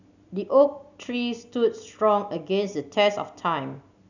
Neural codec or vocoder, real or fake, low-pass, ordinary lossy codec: none; real; 7.2 kHz; none